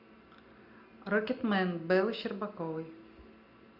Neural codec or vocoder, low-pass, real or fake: none; 5.4 kHz; real